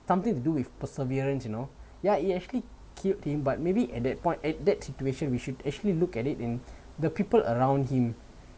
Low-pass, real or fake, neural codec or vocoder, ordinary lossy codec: none; real; none; none